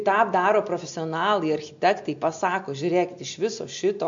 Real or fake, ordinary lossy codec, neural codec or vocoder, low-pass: real; MP3, 48 kbps; none; 7.2 kHz